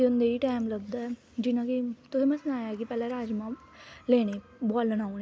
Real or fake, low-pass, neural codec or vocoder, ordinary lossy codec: real; none; none; none